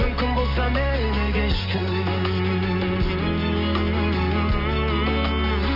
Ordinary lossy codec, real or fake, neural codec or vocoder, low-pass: AAC, 48 kbps; real; none; 5.4 kHz